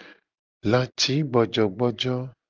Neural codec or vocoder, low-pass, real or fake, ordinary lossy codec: none; 7.2 kHz; real; Opus, 24 kbps